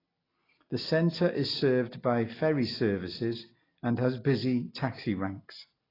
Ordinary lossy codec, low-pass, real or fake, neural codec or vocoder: AAC, 24 kbps; 5.4 kHz; real; none